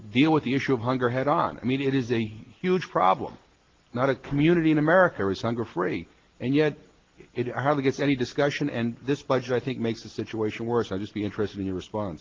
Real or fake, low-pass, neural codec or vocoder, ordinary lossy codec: real; 7.2 kHz; none; Opus, 16 kbps